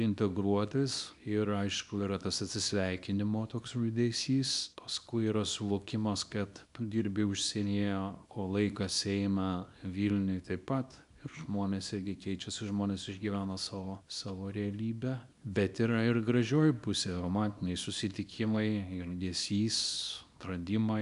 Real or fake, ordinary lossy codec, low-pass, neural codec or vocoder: fake; MP3, 96 kbps; 10.8 kHz; codec, 24 kHz, 0.9 kbps, WavTokenizer, small release